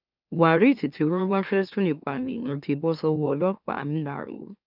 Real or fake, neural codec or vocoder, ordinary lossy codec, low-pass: fake; autoencoder, 44.1 kHz, a latent of 192 numbers a frame, MeloTTS; none; 5.4 kHz